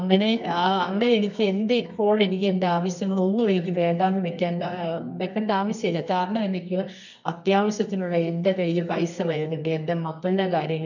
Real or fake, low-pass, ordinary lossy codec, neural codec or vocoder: fake; 7.2 kHz; none; codec, 24 kHz, 0.9 kbps, WavTokenizer, medium music audio release